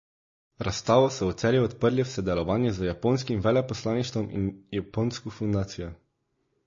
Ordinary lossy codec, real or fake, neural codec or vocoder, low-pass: MP3, 32 kbps; real; none; 7.2 kHz